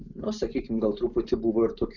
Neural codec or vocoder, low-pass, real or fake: none; 7.2 kHz; real